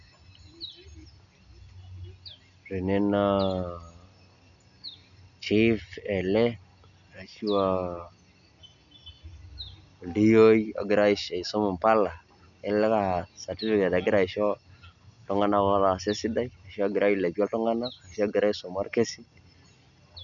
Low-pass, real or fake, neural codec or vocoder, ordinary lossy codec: 7.2 kHz; real; none; none